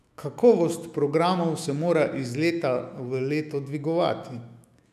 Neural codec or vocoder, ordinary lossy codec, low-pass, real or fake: autoencoder, 48 kHz, 128 numbers a frame, DAC-VAE, trained on Japanese speech; none; 14.4 kHz; fake